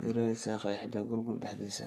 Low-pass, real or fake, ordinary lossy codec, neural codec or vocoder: 14.4 kHz; fake; none; codec, 44.1 kHz, 3.4 kbps, Pupu-Codec